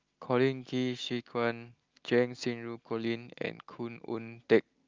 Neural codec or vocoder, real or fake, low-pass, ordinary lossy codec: none; real; 7.2 kHz; Opus, 24 kbps